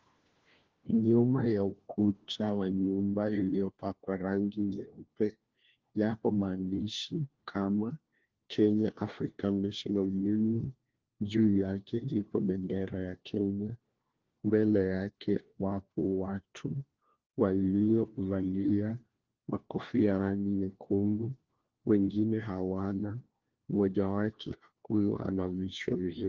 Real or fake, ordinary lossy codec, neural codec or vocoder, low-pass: fake; Opus, 16 kbps; codec, 16 kHz, 1 kbps, FunCodec, trained on LibriTTS, 50 frames a second; 7.2 kHz